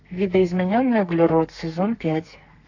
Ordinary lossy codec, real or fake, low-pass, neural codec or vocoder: AAC, 48 kbps; fake; 7.2 kHz; codec, 32 kHz, 1.9 kbps, SNAC